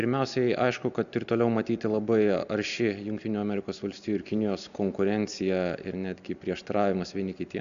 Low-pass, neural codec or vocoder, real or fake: 7.2 kHz; none; real